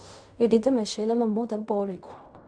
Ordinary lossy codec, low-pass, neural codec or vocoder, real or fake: none; 9.9 kHz; codec, 16 kHz in and 24 kHz out, 0.4 kbps, LongCat-Audio-Codec, fine tuned four codebook decoder; fake